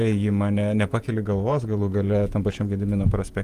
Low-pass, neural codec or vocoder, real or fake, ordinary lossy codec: 14.4 kHz; vocoder, 48 kHz, 128 mel bands, Vocos; fake; Opus, 32 kbps